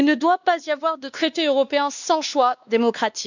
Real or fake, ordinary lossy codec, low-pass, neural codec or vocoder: fake; none; 7.2 kHz; codec, 16 kHz, 2 kbps, X-Codec, WavLM features, trained on Multilingual LibriSpeech